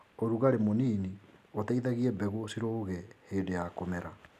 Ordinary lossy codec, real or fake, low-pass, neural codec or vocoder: none; real; 14.4 kHz; none